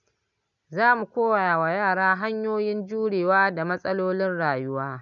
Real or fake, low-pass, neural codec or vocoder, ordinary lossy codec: real; 7.2 kHz; none; none